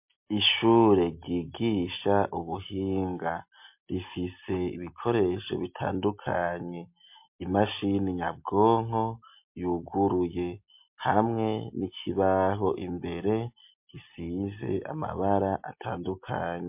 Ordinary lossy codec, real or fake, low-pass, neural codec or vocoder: MP3, 32 kbps; real; 3.6 kHz; none